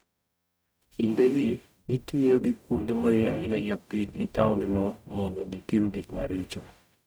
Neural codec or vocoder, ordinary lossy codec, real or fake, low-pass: codec, 44.1 kHz, 0.9 kbps, DAC; none; fake; none